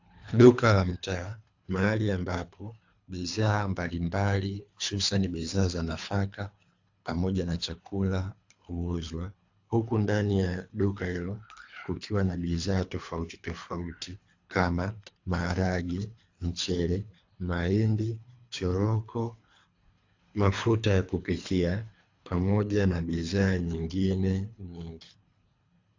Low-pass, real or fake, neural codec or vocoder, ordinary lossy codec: 7.2 kHz; fake; codec, 24 kHz, 3 kbps, HILCodec; AAC, 48 kbps